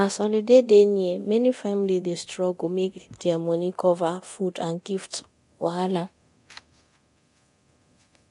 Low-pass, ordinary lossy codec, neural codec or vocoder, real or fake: 10.8 kHz; AAC, 48 kbps; codec, 24 kHz, 0.9 kbps, DualCodec; fake